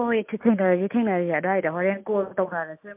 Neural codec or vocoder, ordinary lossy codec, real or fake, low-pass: none; MP3, 32 kbps; real; 3.6 kHz